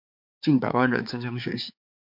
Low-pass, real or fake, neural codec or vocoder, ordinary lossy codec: 5.4 kHz; fake; codec, 16 kHz, 4 kbps, X-Codec, HuBERT features, trained on balanced general audio; MP3, 48 kbps